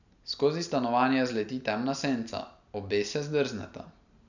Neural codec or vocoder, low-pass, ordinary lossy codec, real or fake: none; 7.2 kHz; none; real